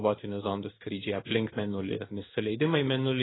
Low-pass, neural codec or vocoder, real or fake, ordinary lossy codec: 7.2 kHz; codec, 16 kHz in and 24 kHz out, 1 kbps, XY-Tokenizer; fake; AAC, 16 kbps